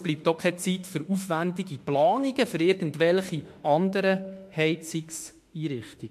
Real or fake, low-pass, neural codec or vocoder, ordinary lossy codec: fake; 14.4 kHz; autoencoder, 48 kHz, 32 numbers a frame, DAC-VAE, trained on Japanese speech; MP3, 64 kbps